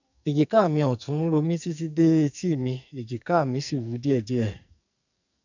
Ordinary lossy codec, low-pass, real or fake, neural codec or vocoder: none; 7.2 kHz; fake; codec, 44.1 kHz, 2.6 kbps, SNAC